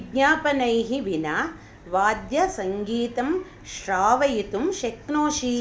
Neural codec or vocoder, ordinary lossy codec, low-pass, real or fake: none; none; none; real